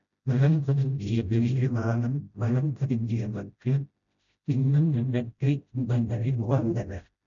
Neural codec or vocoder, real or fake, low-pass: codec, 16 kHz, 0.5 kbps, FreqCodec, smaller model; fake; 7.2 kHz